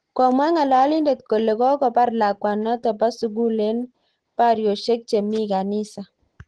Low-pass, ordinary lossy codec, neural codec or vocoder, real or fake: 9.9 kHz; Opus, 16 kbps; none; real